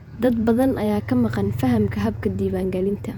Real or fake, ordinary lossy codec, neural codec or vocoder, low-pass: fake; none; vocoder, 48 kHz, 128 mel bands, Vocos; 19.8 kHz